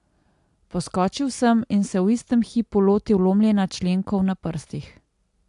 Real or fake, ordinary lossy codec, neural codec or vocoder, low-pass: real; AAC, 64 kbps; none; 10.8 kHz